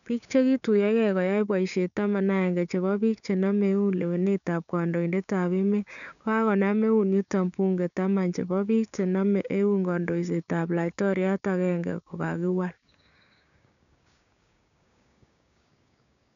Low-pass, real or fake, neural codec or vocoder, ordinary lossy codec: 7.2 kHz; fake; codec, 16 kHz, 6 kbps, DAC; none